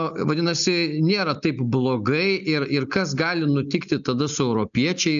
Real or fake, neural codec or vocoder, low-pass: real; none; 7.2 kHz